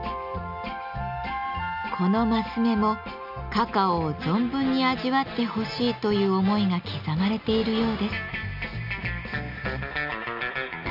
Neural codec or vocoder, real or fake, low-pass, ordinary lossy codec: none; real; 5.4 kHz; none